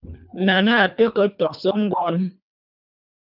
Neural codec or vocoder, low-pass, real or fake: codec, 24 kHz, 3 kbps, HILCodec; 5.4 kHz; fake